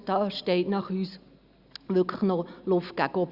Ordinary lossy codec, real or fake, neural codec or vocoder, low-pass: Opus, 64 kbps; real; none; 5.4 kHz